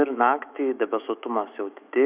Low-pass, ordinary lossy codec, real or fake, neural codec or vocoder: 3.6 kHz; Opus, 64 kbps; fake; autoencoder, 48 kHz, 128 numbers a frame, DAC-VAE, trained on Japanese speech